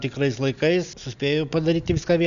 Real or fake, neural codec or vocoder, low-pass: real; none; 7.2 kHz